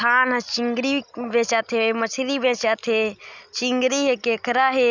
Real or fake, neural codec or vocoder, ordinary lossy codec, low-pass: real; none; none; 7.2 kHz